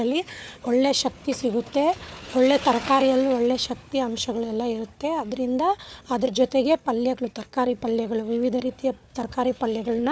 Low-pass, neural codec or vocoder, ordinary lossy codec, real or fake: none; codec, 16 kHz, 4 kbps, FunCodec, trained on Chinese and English, 50 frames a second; none; fake